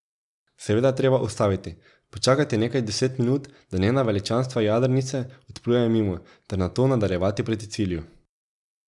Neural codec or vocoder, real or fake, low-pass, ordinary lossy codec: none; real; 10.8 kHz; none